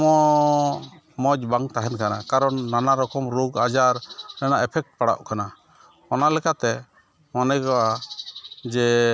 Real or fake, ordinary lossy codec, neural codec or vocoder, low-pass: real; none; none; none